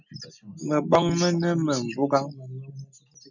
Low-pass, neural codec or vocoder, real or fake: 7.2 kHz; none; real